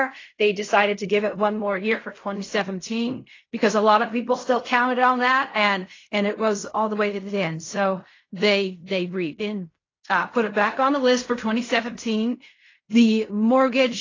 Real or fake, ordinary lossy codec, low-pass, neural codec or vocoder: fake; AAC, 32 kbps; 7.2 kHz; codec, 16 kHz in and 24 kHz out, 0.4 kbps, LongCat-Audio-Codec, fine tuned four codebook decoder